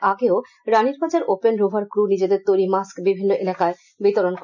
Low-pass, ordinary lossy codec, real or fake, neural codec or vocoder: 7.2 kHz; none; real; none